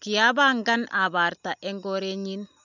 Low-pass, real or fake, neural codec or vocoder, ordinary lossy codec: 7.2 kHz; real; none; none